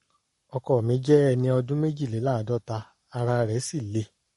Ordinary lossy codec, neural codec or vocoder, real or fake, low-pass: MP3, 48 kbps; codec, 44.1 kHz, 7.8 kbps, Pupu-Codec; fake; 19.8 kHz